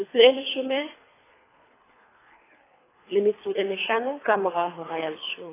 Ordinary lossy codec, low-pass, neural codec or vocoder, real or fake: AAC, 16 kbps; 3.6 kHz; codec, 24 kHz, 3 kbps, HILCodec; fake